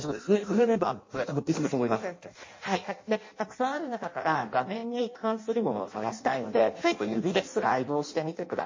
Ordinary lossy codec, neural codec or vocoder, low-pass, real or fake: MP3, 32 kbps; codec, 16 kHz in and 24 kHz out, 0.6 kbps, FireRedTTS-2 codec; 7.2 kHz; fake